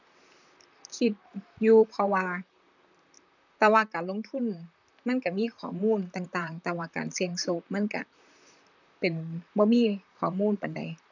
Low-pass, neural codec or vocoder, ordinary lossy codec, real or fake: 7.2 kHz; vocoder, 44.1 kHz, 128 mel bands, Pupu-Vocoder; none; fake